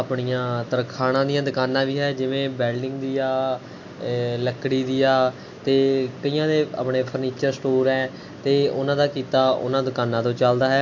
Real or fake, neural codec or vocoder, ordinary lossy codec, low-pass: real; none; MP3, 48 kbps; 7.2 kHz